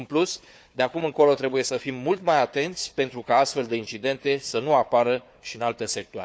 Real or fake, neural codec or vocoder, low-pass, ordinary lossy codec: fake; codec, 16 kHz, 4 kbps, FunCodec, trained on Chinese and English, 50 frames a second; none; none